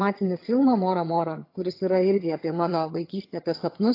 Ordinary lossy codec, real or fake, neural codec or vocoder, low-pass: AAC, 32 kbps; fake; vocoder, 22.05 kHz, 80 mel bands, HiFi-GAN; 5.4 kHz